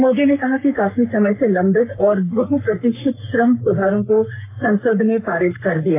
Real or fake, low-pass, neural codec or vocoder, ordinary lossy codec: fake; 3.6 kHz; codec, 44.1 kHz, 3.4 kbps, Pupu-Codec; AAC, 24 kbps